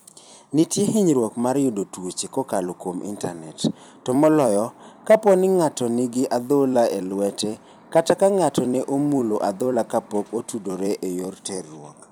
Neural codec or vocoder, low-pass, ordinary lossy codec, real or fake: vocoder, 44.1 kHz, 128 mel bands every 256 samples, BigVGAN v2; none; none; fake